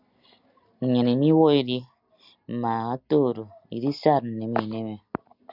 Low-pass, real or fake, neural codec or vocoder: 5.4 kHz; real; none